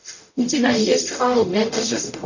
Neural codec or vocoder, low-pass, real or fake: codec, 44.1 kHz, 0.9 kbps, DAC; 7.2 kHz; fake